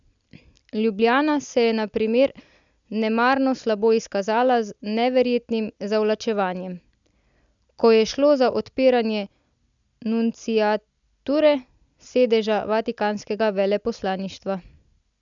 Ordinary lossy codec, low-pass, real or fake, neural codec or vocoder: none; 7.2 kHz; real; none